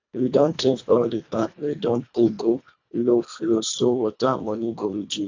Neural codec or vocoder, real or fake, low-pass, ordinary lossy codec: codec, 24 kHz, 1.5 kbps, HILCodec; fake; 7.2 kHz; AAC, 48 kbps